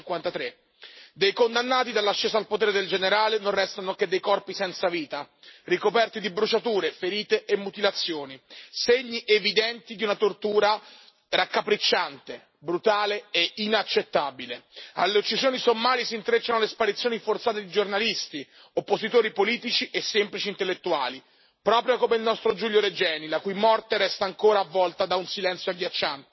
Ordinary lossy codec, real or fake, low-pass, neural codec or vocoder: MP3, 24 kbps; real; 7.2 kHz; none